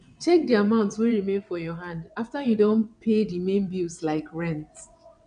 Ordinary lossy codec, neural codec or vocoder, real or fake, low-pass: none; vocoder, 22.05 kHz, 80 mel bands, WaveNeXt; fake; 9.9 kHz